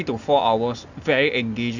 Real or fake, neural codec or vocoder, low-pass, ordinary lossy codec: real; none; 7.2 kHz; none